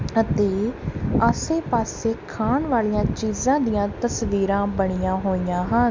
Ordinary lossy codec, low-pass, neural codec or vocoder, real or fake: MP3, 64 kbps; 7.2 kHz; none; real